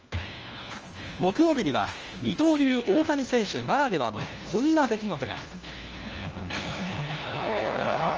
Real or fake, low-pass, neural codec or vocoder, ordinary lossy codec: fake; 7.2 kHz; codec, 16 kHz, 1 kbps, FunCodec, trained on LibriTTS, 50 frames a second; Opus, 24 kbps